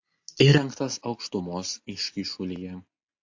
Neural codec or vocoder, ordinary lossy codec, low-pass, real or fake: none; AAC, 48 kbps; 7.2 kHz; real